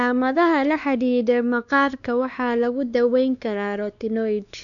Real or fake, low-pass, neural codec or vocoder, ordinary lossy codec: fake; 7.2 kHz; codec, 16 kHz, 2 kbps, X-Codec, WavLM features, trained on Multilingual LibriSpeech; none